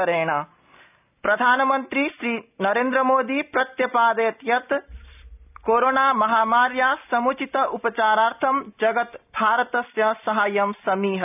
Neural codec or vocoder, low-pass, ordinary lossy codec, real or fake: none; 3.6 kHz; none; real